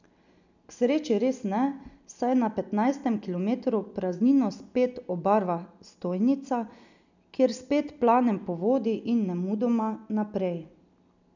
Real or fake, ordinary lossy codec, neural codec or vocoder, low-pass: real; none; none; 7.2 kHz